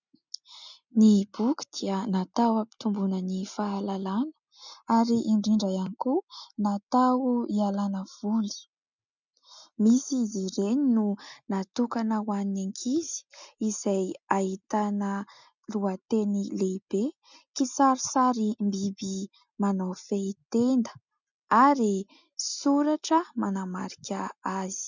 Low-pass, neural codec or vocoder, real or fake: 7.2 kHz; none; real